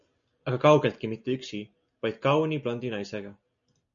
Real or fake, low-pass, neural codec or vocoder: real; 7.2 kHz; none